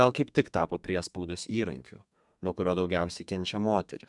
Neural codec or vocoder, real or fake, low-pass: codec, 32 kHz, 1.9 kbps, SNAC; fake; 10.8 kHz